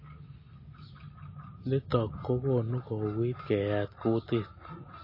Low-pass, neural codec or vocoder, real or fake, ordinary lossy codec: 5.4 kHz; none; real; MP3, 24 kbps